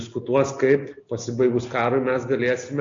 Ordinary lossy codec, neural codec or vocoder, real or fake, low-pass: AAC, 48 kbps; none; real; 7.2 kHz